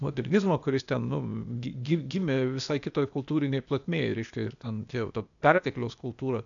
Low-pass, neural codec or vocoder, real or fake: 7.2 kHz; codec, 16 kHz, 0.8 kbps, ZipCodec; fake